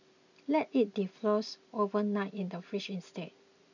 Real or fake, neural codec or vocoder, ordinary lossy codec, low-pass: real; none; none; 7.2 kHz